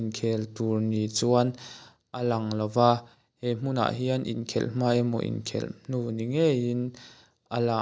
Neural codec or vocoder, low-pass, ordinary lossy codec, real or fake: none; none; none; real